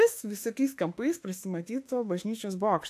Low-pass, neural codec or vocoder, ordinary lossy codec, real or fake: 14.4 kHz; autoencoder, 48 kHz, 32 numbers a frame, DAC-VAE, trained on Japanese speech; AAC, 64 kbps; fake